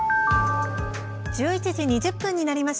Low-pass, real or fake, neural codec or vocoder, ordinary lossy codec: none; real; none; none